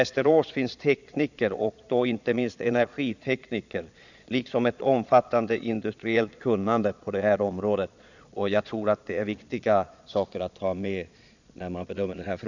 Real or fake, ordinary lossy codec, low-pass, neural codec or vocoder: fake; none; 7.2 kHz; vocoder, 22.05 kHz, 80 mel bands, Vocos